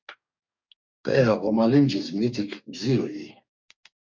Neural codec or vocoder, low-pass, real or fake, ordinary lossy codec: codec, 44.1 kHz, 2.6 kbps, DAC; 7.2 kHz; fake; MP3, 64 kbps